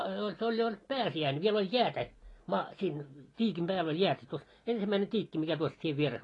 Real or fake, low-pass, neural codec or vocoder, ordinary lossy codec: real; 10.8 kHz; none; AAC, 32 kbps